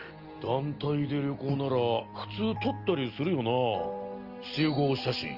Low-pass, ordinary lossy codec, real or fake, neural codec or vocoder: 5.4 kHz; Opus, 24 kbps; real; none